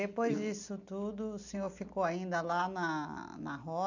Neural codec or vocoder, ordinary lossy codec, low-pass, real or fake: none; none; 7.2 kHz; real